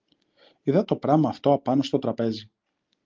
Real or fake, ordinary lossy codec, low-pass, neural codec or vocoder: real; Opus, 32 kbps; 7.2 kHz; none